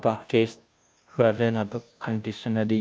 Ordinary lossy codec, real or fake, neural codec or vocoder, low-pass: none; fake; codec, 16 kHz, 0.5 kbps, FunCodec, trained on Chinese and English, 25 frames a second; none